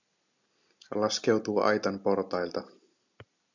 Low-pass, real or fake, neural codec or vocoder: 7.2 kHz; real; none